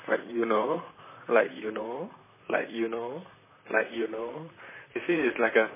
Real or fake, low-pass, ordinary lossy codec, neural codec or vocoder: fake; 3.6 kHz; MP3, 16 kbps; vocoder, 44.1 kHz, 128 mel bands, Pupu-Vocoder